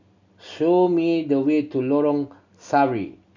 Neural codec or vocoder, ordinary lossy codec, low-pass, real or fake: none; none; 7.2 kHz; real